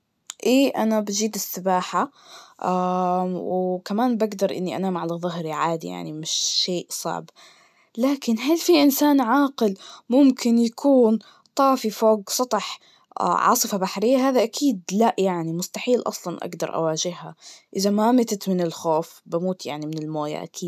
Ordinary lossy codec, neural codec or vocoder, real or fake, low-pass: none; none; real; 14.4 kHz